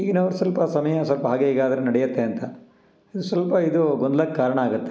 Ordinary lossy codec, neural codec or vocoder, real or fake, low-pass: none; none; real; none